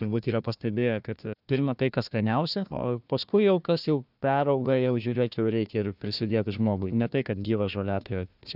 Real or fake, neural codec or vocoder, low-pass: fake; codec, 16 kHz, 1 kbps, FunCodec, trained on Chinese and English, 50 frames a second; 5.4 kHz